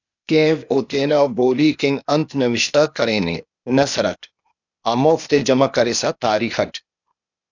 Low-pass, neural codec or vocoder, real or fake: 7.2 kHz; codec, 16 kHz, 0.8 kbps, ZipCodec; fake